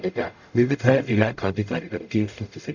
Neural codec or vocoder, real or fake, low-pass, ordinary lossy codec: codec, 44.1 kHz, 0.9 kbps, DAC; fake; 7.2 kHz; none